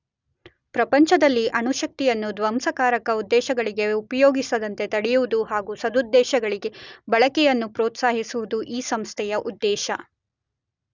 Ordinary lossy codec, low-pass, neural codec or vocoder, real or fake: none; 7.2 kHz; none; real